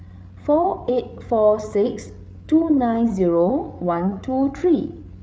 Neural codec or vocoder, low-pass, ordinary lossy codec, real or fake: codec, 16 kHz, 8 kbps, FreqCodec, larger model; none; none; fake